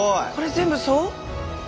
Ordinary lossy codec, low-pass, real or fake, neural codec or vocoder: none; none; real; none